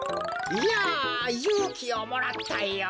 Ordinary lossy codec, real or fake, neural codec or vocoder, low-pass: none; real; none; none